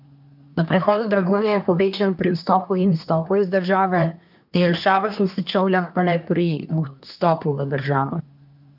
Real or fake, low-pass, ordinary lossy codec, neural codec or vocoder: fake; 5.4 kHz; none; codec, 24 kHz, 1 kbps, SNAC